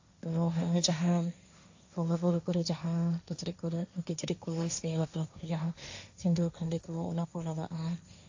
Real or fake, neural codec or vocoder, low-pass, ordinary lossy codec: fake; codec, 16 kHz, 1.1 kbps, Voila-Tokenizer; 7.2 kHz; AAC, 48 kbps